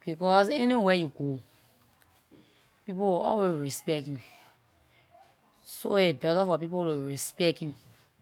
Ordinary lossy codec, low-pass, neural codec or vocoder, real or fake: none; 19.8 kHz; codec, 44.1 kHz, 7.8 kbps, DAC; fake